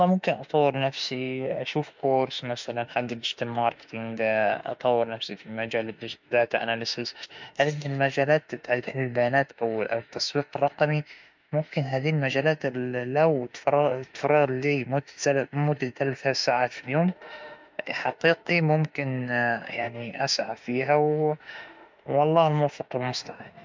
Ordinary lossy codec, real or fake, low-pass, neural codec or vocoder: MP3, 64 kbps; fake; 7.2 kHz; autoencoder, 48 kHz, 32 numbers a frame, DAC-VAE, trained on Japanese speech